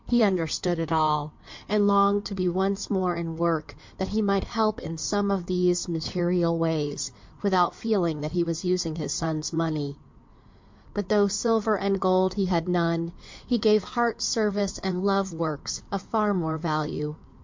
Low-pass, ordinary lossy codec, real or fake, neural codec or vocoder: 7.2 kHz; MP3, 48 kbps; fake; codec, 16 kHz in and 24 kHz out, 2.2 kbps, FireRedTTS-2 codec